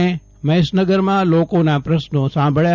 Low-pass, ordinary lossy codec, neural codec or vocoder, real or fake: 7.2 kHz; none; none; real